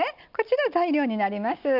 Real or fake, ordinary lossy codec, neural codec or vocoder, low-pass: real; none; none; 5.4 kHz